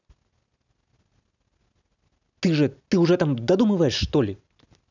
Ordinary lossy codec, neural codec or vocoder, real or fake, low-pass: none; none; real; 7.2 kHz